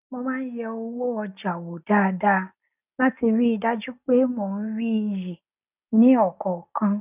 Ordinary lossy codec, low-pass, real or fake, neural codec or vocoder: none; 3.6 kHz; real; none